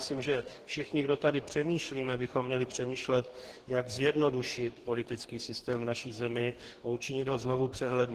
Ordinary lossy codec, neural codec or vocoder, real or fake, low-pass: Opus, 16 kbps; codec, 44.1 kHz, 2.6 kbps, DAC; fake; 14.4 kHz